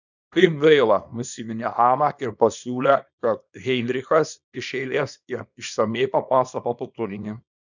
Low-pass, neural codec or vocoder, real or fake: 7.2 kHz; codec, 24 kHz, 0.9 kbps, WavTokenizer, small release; fake